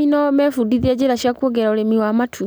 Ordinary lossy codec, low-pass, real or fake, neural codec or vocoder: none; none; real; none